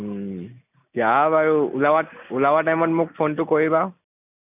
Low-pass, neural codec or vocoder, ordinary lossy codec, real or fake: 3.6 kHz; none; none; real